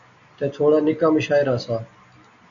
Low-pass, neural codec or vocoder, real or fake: 7.2 kHz; none; real